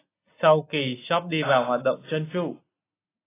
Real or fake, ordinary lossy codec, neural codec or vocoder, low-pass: real; AAC, 16 kbps; none; 3.6 kHz